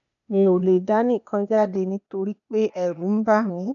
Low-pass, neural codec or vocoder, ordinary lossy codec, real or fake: 7.2 kHz; codec, 16 kHz, 0.8 kbps, ZipCodec; none; fake